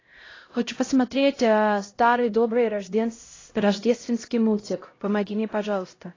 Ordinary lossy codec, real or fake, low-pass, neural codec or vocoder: AAC, 32 kbps; fake; 7.2 kHz; codec, 16 kHz, 0.5 kbps, X-Codec, HuBERT features, trained on LibriSpeech